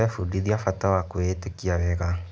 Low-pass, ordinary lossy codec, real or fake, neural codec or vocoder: none; none; real; none